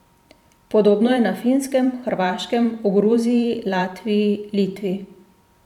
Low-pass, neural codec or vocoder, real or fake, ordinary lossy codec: 19.8 kHz; vocoder, 44.1 kHz, 128 mel bands every 512 samples, BigVGAN v2; fake; none